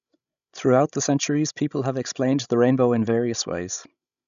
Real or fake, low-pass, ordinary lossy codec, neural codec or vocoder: fake; 7.2 kHz; none; codec, 16 kHz, 16 kbps, FreqCodec, larger model